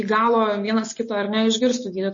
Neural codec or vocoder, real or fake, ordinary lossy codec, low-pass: none; real; MP3, 32 kbps; 7.2 kHz